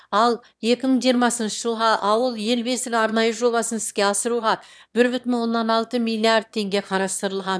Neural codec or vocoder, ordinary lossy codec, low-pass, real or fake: autoencoder, 22.05 kHz, a latent of 192 numbers a frame, VITS, trained on one speaker; none; none; fake